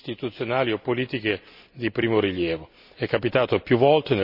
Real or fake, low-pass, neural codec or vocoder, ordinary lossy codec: real; 5.4 kHz; none; none